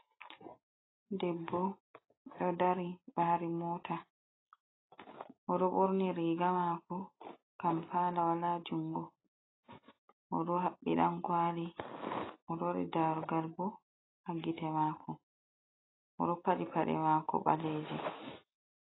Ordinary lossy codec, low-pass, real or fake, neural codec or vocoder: AAC, 16 kbps; 7.2 kHz; real; none